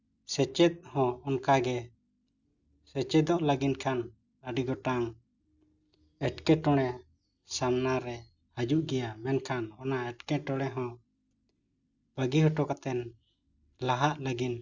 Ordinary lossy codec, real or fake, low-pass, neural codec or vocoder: none; real; 7.2 kHz; none